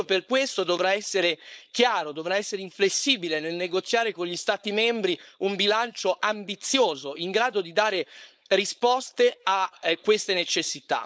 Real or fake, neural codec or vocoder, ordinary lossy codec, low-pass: fake; codec, 16 kHz, 4.8 kbps, FACodec; none; none